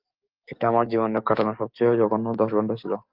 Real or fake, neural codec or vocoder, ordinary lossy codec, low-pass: fake; codec, 44.1 kHz, 7.8 kbps, DAC; Opus, 24 kbps; 5.4 kHz